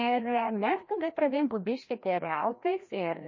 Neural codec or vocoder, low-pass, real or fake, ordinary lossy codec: codec, 16 kHz, 1 kbps, FreqCodec, larger model; 7.2 kHz; fake; MP3, 32 kbps